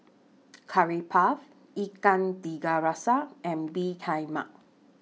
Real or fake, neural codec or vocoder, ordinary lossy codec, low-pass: real; none; none; none